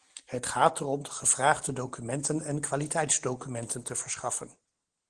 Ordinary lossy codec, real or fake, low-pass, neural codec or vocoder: Opus, 16 kbps; real; 9.9 kHz; none